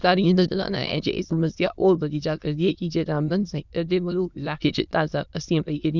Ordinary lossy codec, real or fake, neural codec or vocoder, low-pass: none; fake; autoencoder, 22.05 kHz, a latent of 192 numbers a frame, VITS, trained on many speakers; 7.2 kHz